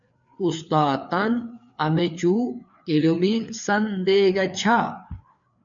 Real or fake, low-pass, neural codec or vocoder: fake; 7.2 kHz; codec, 16 kHz, 4 kbps, FreqCodec, larger model